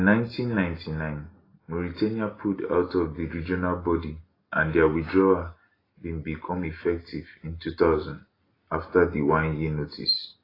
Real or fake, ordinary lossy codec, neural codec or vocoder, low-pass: real; AAC, 24 kbps; none; 5.4 kHz